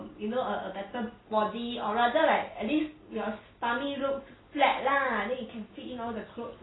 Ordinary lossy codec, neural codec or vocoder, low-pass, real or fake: AAC, 16 kbps; none; 7.2 kHz; real